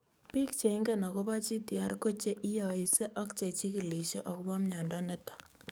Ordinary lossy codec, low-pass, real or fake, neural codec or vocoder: none; none; fake; codec, 44.1 kHz, 7.8 kbps, DAC